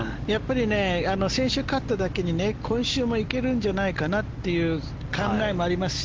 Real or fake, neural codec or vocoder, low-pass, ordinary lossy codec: real; none; 7.2 kHz; Opus, 16 kbps